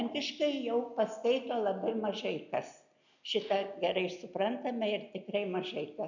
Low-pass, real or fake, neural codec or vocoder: 7.2 kHz; real; none